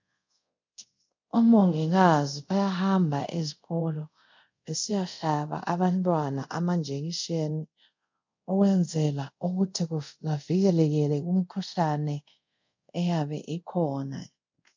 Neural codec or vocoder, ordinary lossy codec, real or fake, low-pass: codec, 24 kHz, 0.5 kbps, DualCodec; MP3, 64 kbps; fake; 7.2 kHz